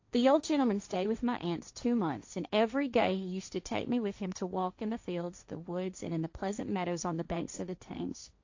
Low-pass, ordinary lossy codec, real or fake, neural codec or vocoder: 7.2 kHz; AAC, 48 kbps; fake; codec, 16 kHz, 1.1 kbps, Voila-Tokenizer